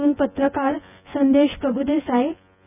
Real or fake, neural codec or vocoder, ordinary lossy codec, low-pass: fake; vocoder, 24 kHz, 100 mel bands, Vocos; none; 3.6 kHz